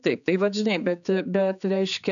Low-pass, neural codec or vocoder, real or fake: 7.2 kHz; codec, 16 kHz, 4 kbps, X-Codec, HuBERT features, trained on general audio; fake